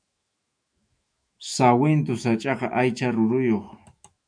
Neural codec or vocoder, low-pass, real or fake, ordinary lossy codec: autoencoder, 48 kHz, 128 numbers a frame, DAC-VAE, trained on Japanese speech; 9.9 kHz; fake; MP3, 96 kbps